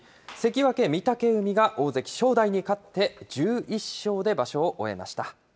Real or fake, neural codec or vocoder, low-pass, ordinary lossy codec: real; none; none; none